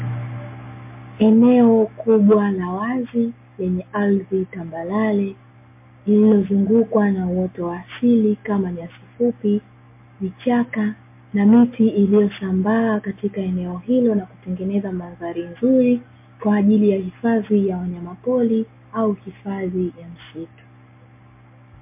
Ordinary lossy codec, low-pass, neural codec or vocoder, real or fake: MP3, 24 kbps; 3.6 kHz; none; real